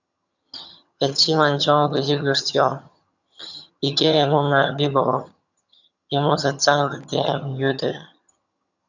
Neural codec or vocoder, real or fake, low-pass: vocoder, 22.05 kHz, 80 mel bands, HiFi-GAN; fake; 7.2 kHz